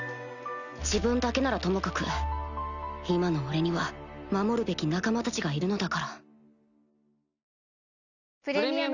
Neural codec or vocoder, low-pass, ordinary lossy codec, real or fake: none; 7.2 kHz; none; real